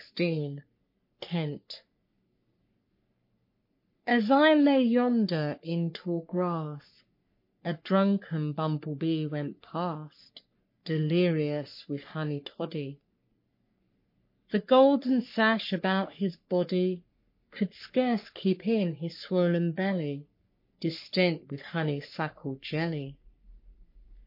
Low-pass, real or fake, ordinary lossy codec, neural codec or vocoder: 5.4 kHz; fake; MP3, 32 kbps; codec, 44.1 kHz, 3.4 kbps, Pupu-Codec